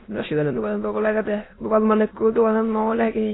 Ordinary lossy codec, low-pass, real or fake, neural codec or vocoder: AAC, 16 kbps; 7.2 kHz; fake; autoencoder, 22.05 kHz, a latent of 192 numbers a frame, VITS, trained on many speakers